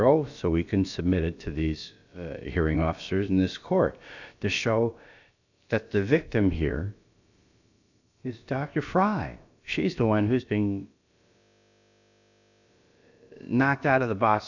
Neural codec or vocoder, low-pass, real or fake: codec, 16 kHz, about 1 kbps, DyCAST, with the encoder's durations; 7.2 kHz; fake